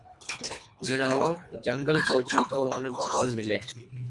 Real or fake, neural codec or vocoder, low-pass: fake; codec, 24 kHz, 1.5 kbps, HILCodec; 10.8 kHz